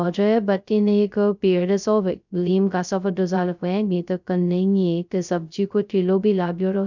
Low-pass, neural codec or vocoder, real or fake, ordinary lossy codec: 7.2 kHz; codec, 16 kHz, 0.2 kbps, FocalCodec; fake; none